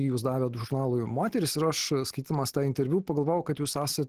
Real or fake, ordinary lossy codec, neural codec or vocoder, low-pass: real; Opus, 16 kbps; none; 14.4 kHz